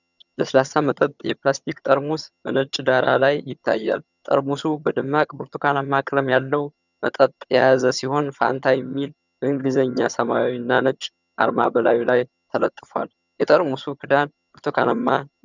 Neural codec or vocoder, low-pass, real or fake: vocoder, 22.05 kHz, 80 mel bands, HiFi-GAN; 7.2 kHz; fake